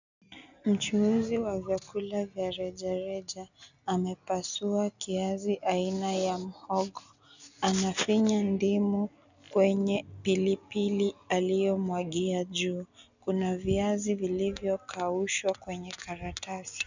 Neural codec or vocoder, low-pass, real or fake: none; 7.2 kHz; real